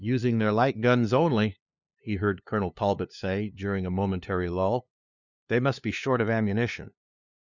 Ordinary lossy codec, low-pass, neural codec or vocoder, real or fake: Opus, 64 kbps; 7.2 kHz; codec, 16 kHz, 2 kbps, FunCodec, trained on LibriTTS, 25 frames a second; fake